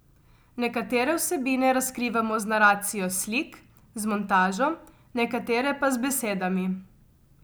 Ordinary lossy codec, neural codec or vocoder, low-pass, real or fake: none; none; none; real